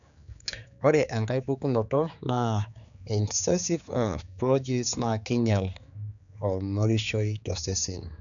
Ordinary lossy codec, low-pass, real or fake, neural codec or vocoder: none; 7.2 kHz; fake; codec, 16 kHz, 4 kbps, X-Codec, HuBERT features, trained on general audio